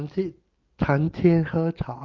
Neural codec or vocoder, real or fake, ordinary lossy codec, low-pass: none; real; Opus, 32 kbps; 7.2 kHz